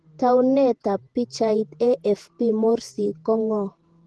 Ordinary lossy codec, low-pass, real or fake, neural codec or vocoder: Opus, 16 kbps; 10.8 kHz; fake; vocoder, 48 kHz, 128 mel bands, Vocos